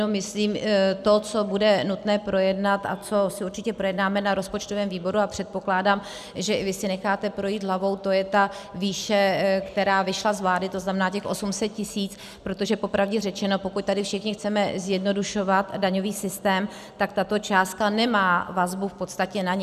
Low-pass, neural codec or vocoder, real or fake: 14.4 kHz; none; real